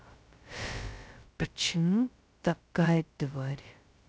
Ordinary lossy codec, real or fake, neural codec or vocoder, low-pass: none; fake; codec, 16 kHz, 0.2 kbps, FocalCodec; none